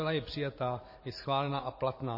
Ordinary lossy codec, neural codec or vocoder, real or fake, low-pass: MP3, 24 kbps; vocoder, 44.1 kHz, 128 mel bands every 512 samples, BigVGAN v2; fake; 5.4 kHz